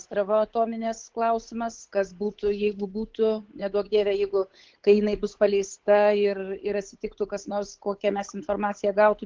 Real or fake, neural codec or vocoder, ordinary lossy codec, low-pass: fake; codec, 16 kHz, 8 kbps, FunCodec, trained on Chinese and English, 25 frames a second; Opus, 16 kbps; 7.2 kHz